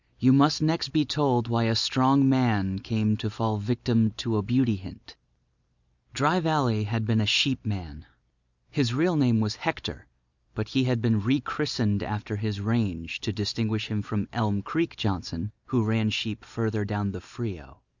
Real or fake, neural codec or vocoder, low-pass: real; none; 7.2 kHz